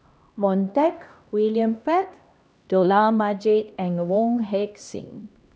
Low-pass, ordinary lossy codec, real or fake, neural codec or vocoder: none; none; fake; codec, 16 kHz, 1 kbps, X-Codec, HuBERT features, trained on LibriSpeech